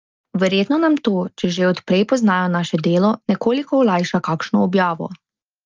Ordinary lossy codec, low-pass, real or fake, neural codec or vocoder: Opus, 32 kbps; 7.2 kHz; real; none